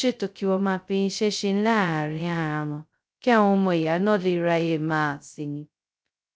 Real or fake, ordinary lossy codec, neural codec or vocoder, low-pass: fake; none; codec, 16 kHz, 0.2 kbps, FocalCodec; none